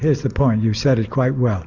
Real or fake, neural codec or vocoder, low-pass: real; none; 7.2 kHz